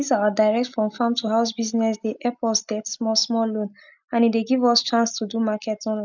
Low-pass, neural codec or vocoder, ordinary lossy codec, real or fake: 7.2 kHz; none; none; real